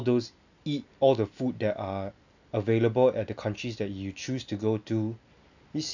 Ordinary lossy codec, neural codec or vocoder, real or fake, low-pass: none; none; real; 7.2 kHz